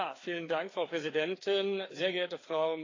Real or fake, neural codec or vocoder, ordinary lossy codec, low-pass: fake; codec, 16 kHz, 2 kbps, FreqCodec, larger model; AAC, 32 kbps; 7.2 kHz